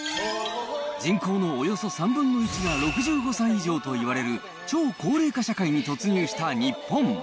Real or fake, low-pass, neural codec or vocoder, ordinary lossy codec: real; none; none; none